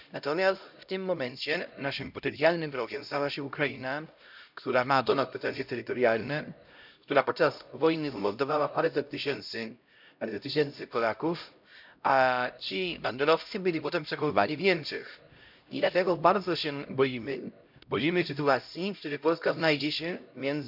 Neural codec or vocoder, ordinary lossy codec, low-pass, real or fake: codec, 16 kHz, 0.5 kbps, X-Codec, HuBERT features, trained on LibriSpeech; none; 5.4 kHz; fake